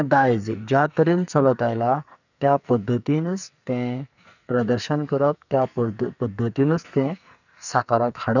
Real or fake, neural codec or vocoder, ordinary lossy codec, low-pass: fake; codec, 44.1 kHz, 2.6 kbps, SNAC; none; 7.2 kHz